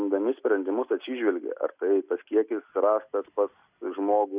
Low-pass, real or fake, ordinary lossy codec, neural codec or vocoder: 3.6 kHz; real; Opus, 64 kbps; none